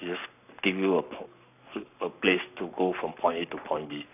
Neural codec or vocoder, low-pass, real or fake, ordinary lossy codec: codec, 44.1 kHz, 7.8 kbps, Pupu-Codec; 3.6 kHz; fake; none